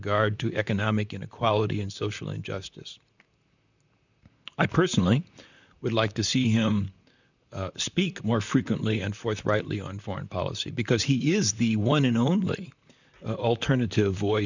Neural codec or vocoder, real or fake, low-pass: vocoder, 44.1 kHz, 128 mel bands, Pupu-Vocoder; fake; 7.2 kHz